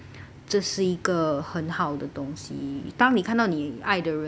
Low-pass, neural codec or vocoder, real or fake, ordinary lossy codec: none; none; real; none